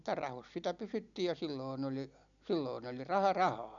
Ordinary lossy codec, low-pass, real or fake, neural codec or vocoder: none; 7.2 kHz; real; none